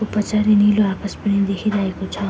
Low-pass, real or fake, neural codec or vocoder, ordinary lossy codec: none; real; none; none